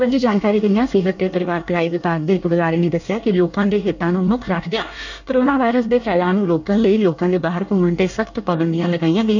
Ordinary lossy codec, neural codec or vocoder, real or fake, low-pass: none; codec, 24 kHz, 1 kbps, SNAC; fake; 7.2 kHz